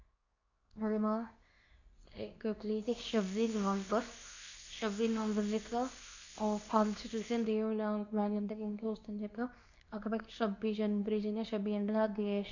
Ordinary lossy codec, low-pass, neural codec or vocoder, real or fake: none; 7.2 kHz; codec, 24 kHz, 0.9 kbps, WavTokenizer, medium speech release version 2; fake